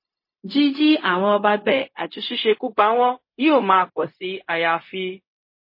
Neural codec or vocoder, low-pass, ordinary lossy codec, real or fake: codec, 16 kHz, 0.4 kbps, LongCat-Audio-Codec; 5.4 kHz; MP3, 24 kbps; fake